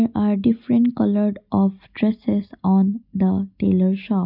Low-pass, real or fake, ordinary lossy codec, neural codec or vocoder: 5.4 kHz; real; none; none